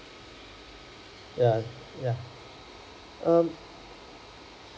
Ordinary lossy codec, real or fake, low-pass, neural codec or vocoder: none; real; none; none